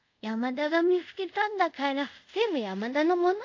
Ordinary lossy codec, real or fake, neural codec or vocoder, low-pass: AAC, 48 kbps; fake; codec, 24 kHz, 0.5 kbps, DualCodec; 7.2 kHz